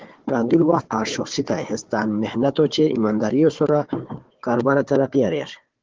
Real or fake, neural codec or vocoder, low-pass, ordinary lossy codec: fake; codec, 16 kHz, 4 kbps, FunCodec, trained on Chinese and English, 50 frames a second; 7.2 kHz; Opus, 16 kbps